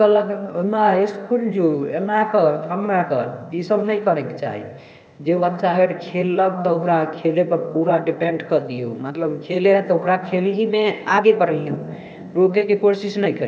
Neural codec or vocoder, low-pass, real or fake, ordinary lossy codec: codec, 16 kHz, 0.8 kbps, ZipCodec; none; fake; none